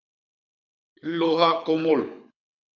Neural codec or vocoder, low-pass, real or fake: codec, 24 kHz, 6 kbps, HILCodec; 7.2 kHz; fake